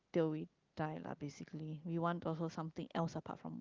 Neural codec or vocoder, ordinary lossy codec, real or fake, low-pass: none; Opus, 32 kbps; real; 7.2 kHz